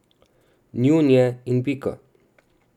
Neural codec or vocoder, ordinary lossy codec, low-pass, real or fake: none; none; 19.8 kHz; real